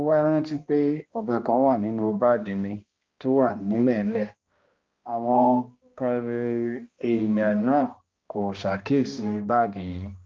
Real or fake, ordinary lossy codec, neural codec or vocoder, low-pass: fake; Opus, 24 kbps; codec, 16 kHz, 1 kbps, X-Codec, HuBERT features, trained on balanced general audio; 7.2 kHz